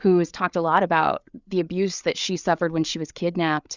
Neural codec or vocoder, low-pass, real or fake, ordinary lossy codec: codec, 16 kHz, 8 kbps, FreqCodec, larger model; 7.2 kHz; fake; Opus, 64 kbps